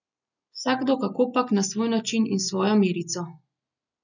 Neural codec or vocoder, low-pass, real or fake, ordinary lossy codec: none; 7.2 kHz; real; none